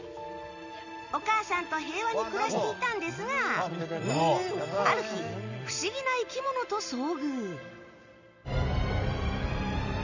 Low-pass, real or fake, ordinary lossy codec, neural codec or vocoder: 7.2 kHz; real; none; none